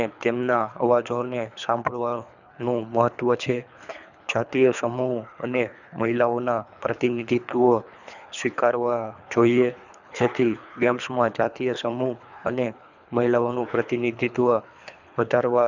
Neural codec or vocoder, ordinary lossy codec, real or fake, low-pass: codec, 24 kHz, 3 kbps, HILCodec; none; fake; 7.2 kHz